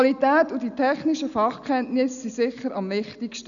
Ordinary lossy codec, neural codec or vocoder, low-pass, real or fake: none; none; 7.2 kHz; real